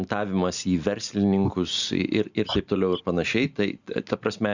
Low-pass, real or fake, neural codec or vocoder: 7.2 kHz; real; none